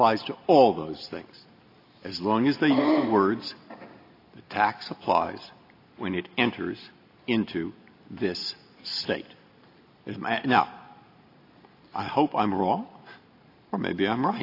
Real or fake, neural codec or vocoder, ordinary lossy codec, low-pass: real; none; AAC, 32 kbps; 5.4 kHz